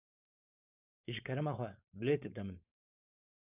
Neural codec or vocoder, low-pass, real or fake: codec, 16 kHz, 4.8 kbps, FACodec; 3.6 kHz; fake